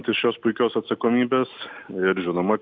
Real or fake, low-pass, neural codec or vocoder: real; 7.2 kHz; none